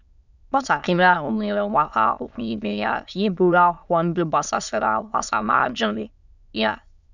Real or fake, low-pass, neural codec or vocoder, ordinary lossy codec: fake; 7.2 kHz; autoencoder, 22.05 kHz, a latent of 192 numbers a frame, VITS, trained on many speakers; none